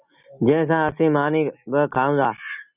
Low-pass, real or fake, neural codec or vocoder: 3.6 kHz; real; none